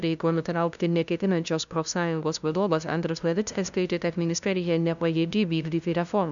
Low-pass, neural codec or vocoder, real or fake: 7.2 kHz; codec, 16 kHz, 0.5 kbps, FunCodec, trained on LibriTTS, 25 frames a second; fake